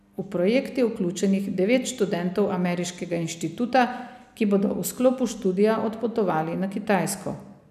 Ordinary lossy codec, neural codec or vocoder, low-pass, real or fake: none; none; 14.4 kHz; real